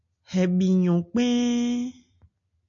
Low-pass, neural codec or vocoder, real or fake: 7.2 kHz; none; real